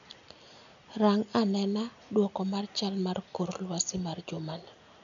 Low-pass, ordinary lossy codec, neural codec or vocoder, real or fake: 7.2 kHz; none; none; real